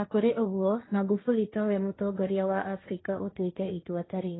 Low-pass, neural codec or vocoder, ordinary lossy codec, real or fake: 7.2 kHz; codec, 16 kHz, 1.1 kbps, Voila-Tokenizer; AAC, 16 kbps; fake